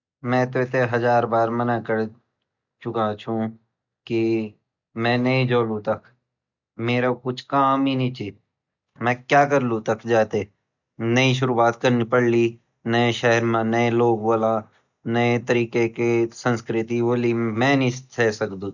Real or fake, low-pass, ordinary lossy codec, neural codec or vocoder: real; 7.2 kHz; none; none